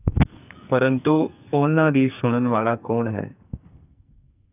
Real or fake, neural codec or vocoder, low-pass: fake; codec, 44.1 kHz, 2.6 kbps, SNAC; 3.6 kHz